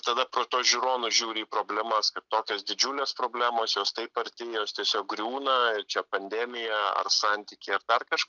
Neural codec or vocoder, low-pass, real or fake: none; 7.2 kHz; real